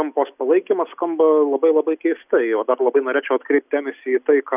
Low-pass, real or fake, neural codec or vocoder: 3.6 kHz; real; none